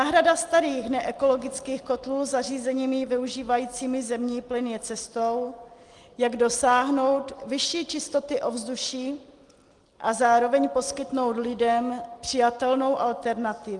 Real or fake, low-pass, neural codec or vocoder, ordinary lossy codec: real; 10.8 kHz; none; Opus, 24 kbps